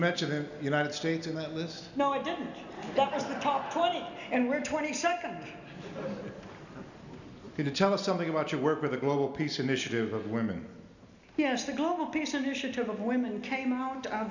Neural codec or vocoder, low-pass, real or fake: none; 7.2 kHz; real